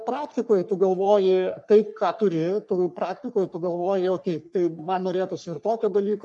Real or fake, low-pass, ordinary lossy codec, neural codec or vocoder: fake; 10.8 kHz; MP3, 96 kbps; codec, 44.1 kHz, 3.4 kbps, Pupu-Codec